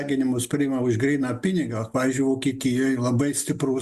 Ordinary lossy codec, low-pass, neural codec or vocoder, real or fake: Opus, 64 kbps; 14.4 kHz; none; real